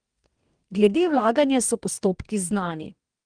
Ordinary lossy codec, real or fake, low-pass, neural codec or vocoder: Opus, 24 kbps; fake; 9.9 kHz; codec, 44.1 kHz, 2.6 kbps, DAC